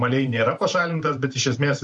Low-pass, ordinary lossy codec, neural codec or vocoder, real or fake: 10.8 kHz; MP3, 48 kbps; vocoder, 44.1 kHz, 128 mel bands every 512 samples, BigVGAN v2; fake